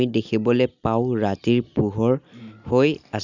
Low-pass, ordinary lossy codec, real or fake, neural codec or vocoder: 7.2 kHz; none; real; none